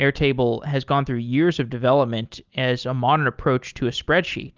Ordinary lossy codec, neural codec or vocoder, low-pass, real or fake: Opus, 32 kbps; none; 7.2 kHz; real